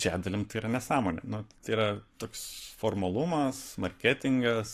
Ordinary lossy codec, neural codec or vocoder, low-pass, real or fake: AAC, 48 kbps; codec, 44.1 kHz, 7.8 kbps, Pupu-Codec; 14.4 kHz; fake